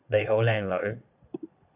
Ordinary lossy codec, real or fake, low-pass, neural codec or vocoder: AAC, 32 kbps; fake; 3.6 kHz; vocoder, 22.05 kHz, 80 mel bands, Vocos